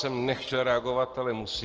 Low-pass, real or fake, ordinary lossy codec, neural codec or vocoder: 7.2 kHz; real; Opus, 16 kbps; none